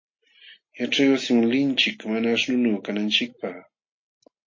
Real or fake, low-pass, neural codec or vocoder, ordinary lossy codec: real; 7.2 kHz; none; MP3, 32 kbps